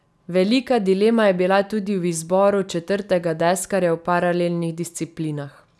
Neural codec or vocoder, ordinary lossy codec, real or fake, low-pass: none; none; real; none